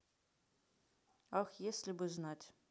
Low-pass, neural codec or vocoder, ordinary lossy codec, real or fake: none; none; none; real